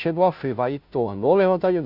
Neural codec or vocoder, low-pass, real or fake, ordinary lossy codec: codec, 16 kHz, 0.5 kbps, FunCodec, trained on Chinese and English, 25 frames a second; 5.4 kHz; fake; none